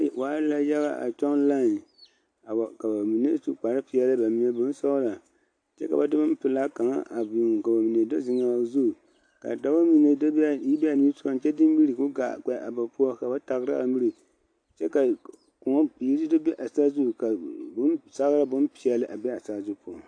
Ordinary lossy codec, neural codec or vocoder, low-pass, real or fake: AAC, 48 kbps; none; 9.9 kHz; real